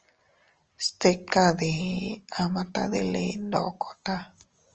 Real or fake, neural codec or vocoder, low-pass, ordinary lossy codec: real; none; 7.2 kHz; Opus, 24 kbps